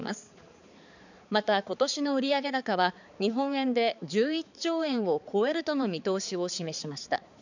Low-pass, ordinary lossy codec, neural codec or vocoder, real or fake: 7.2 kHz; none; codec, 16 kHz, 4 kbps, X-Codec, HuBERT features, trained on balanced general audio; fake